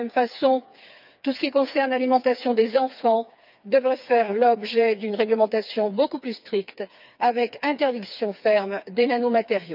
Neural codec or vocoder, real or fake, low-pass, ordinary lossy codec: codec, 16 kHz, 4 kbps, FreqCodec, smaller model; fake; 5.4 kHz; none